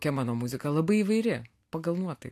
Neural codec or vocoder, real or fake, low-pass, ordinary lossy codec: none; real; 14.4 kHz; AAC, 64 kbps